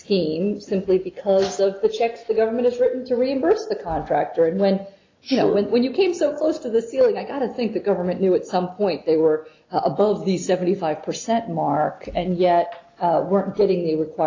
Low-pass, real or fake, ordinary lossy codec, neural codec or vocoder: 7.2 kHz; real; AAC, 32 kbps; none